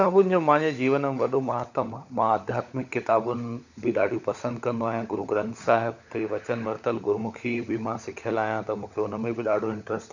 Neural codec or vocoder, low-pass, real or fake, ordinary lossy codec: codec, 16 kHz, 16 kbps, FunCodec, trained on LibriTTS, 50 frames a second; 7.2 kHz; fake; none